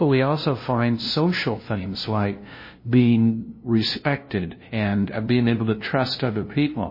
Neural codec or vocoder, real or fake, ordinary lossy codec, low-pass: codec, 16 kHz, 0.5 kbps, FunCodec, trained on LibriTTS, 25 frames a second; fake; MP3, 24 kbps; 5.4 kHz